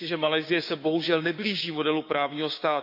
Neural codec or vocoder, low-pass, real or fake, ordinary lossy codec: codec, 16 kHz, 6 kbps, DAC; 5.4 kHz; fake; none